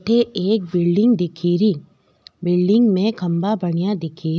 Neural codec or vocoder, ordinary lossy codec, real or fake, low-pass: none; none; real; none